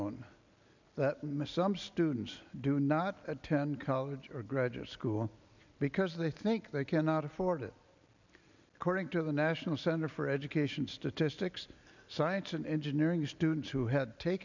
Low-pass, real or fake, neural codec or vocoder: 7.2 kHz; real; none